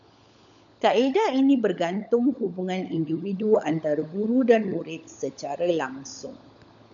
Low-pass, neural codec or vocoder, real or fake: 7.2 kHz; codec, 16 kHz, 16 kbps, FunCodec, trained on LibriTTS, 50 frames a second; fake